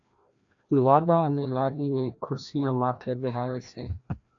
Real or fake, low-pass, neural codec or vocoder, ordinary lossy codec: fake; 7.2 kHz; codec, 16 kHz, 1 kbps, FreqCodec, larger model; AAC, 64 kbps